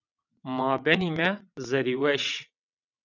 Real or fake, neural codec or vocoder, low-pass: fake; vocoder, 22.05 kHz, 80 mel bands, WaveNeXt; 7.2 kHz